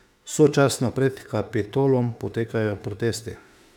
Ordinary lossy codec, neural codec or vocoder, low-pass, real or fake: none; autoencoder, 48 kHz, 32 numbers a frame, DAC-VAE, trained on Japanese speech; 19.8 kHz; fake